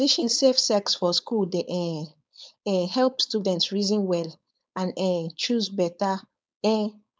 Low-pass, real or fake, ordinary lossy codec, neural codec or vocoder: none; fake; none; codec, 16 kHz, 4.8 kbps, FACodec